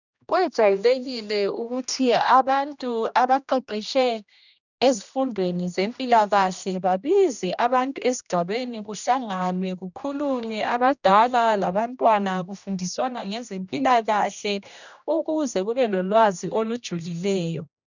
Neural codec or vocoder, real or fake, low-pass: codec, 16 kHz, 1 kbps, X-Codec, HuBERT features, trained on general audio; fake; 7.2 kHz